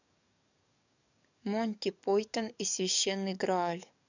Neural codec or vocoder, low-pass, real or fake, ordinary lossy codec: codec, 44.1 kHz, 7.8 kbps, DAC; 7.2 kHz; fake; none